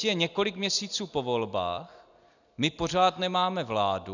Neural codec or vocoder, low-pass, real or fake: none; 7.2 kHz; real